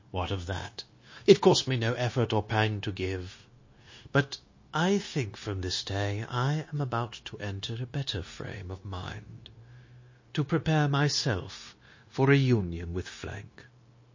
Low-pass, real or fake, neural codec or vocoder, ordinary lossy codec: 7.2 kHz; fake; codec, 16 kHz, 0.9 kbps, LongCat-Audio-Codec; MP3, 32 kbps